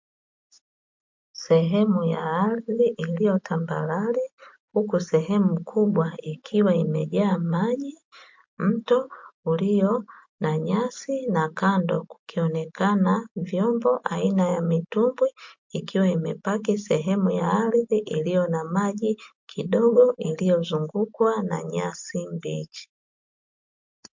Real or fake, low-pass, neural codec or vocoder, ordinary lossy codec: real; 7.2 kHz; none; MP3, 48 kbps